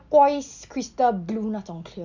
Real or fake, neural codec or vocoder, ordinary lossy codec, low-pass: real; none; none; 7.2 kHz